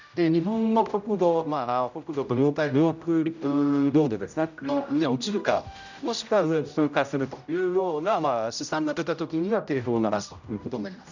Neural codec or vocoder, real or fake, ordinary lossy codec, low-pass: codec, 16 kHz, 0.5 kbps, X-Codec, HuBERT features, trained on general audio; fake; none; 7.2 kHz